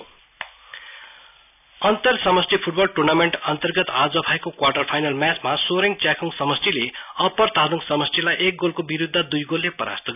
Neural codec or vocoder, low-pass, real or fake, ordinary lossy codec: none; 3.6 kHz; real; none